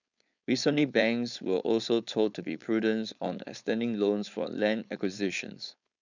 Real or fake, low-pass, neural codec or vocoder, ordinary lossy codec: fake; 7.2 kHz; codec, 16 kHz, 4.8 kbps, FACodec; none